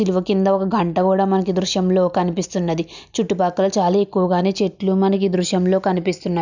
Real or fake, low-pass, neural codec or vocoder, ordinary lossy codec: real; 7.2 kHz; none; none